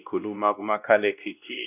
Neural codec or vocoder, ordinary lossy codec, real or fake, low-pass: codec, 16 kHz, 1 kbps, X-Codec, WavLM features, trained on Multilingual LibriSpeech; none; fake; 3.6 kHz